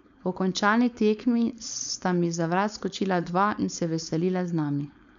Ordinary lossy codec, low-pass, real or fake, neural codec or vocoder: MP3, 96 kbps; 7.2 kHz; fake; codec, 16 kHz, 4.8 kbps, FACodec